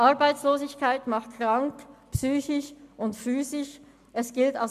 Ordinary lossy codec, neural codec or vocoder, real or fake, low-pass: MP3, 96 kbps; none; real; 14.4 kHz